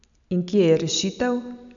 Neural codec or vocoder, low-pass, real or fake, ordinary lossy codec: none; 7.2 kHz; real; none